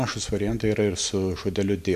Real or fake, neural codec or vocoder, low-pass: real; none; 14.4 kHz